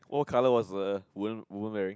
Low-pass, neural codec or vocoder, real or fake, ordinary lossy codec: none; none; real; none